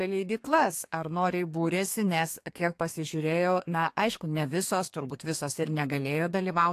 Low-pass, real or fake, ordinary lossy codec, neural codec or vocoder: 14.4 kHz; fake; AAC, 64 kbps; codec, 32 kHz, 1.9 kbps, SNAC